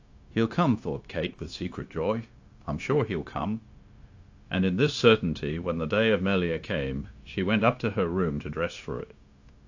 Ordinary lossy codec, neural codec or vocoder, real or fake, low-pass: AAC, 48 kbps; codec, 16 kHz, 0.9 kbps, LongCat-Audio-Codec; fake; 7.2 kHz